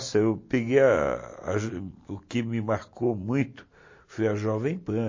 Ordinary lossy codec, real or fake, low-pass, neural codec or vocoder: MP3, 32 kbps; real; 7.2 kHz; none